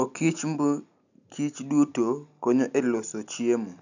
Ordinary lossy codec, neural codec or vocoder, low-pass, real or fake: none; none; 7.2 kHz; real